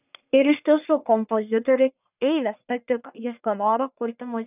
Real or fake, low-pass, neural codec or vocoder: fake; 3.6 kHz; codec, 44.1 kHz, 1.7 kbps, Pupu-Codec